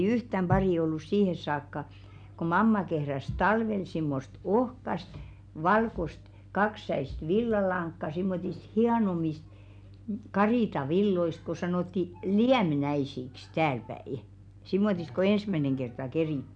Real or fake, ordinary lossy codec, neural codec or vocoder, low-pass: real; none; none; 9.9 kHz